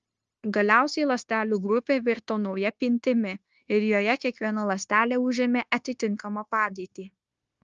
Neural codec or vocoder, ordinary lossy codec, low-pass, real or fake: codec, 16 kHz, 0.9 kbps, LongCat-Audio-Codec; Opus, 24 kbps; 7.2 kHz; fake